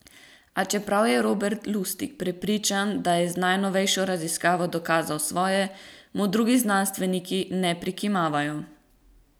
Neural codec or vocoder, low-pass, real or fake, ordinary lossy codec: none; none; real; none